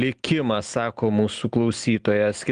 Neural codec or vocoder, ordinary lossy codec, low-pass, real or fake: none; Opus, 24 kbps; 9.9 kHz; real